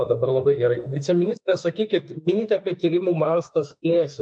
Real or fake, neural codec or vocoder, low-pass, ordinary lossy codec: fake; autoencoder, 48 kHz, 32 numbers a frame, DAC-VAE, trained on Japanese speech; 9.9 kHz; MP3, 64 kbps